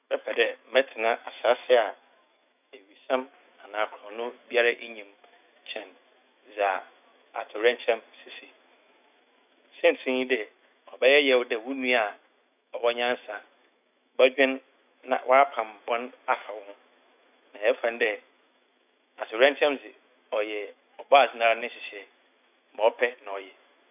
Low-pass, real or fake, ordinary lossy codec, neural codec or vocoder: 3.6 kHz; fake; none; autoencoder, 48 kHz, 128 numbers a frame, DAC-VAE, trained on Japanese speech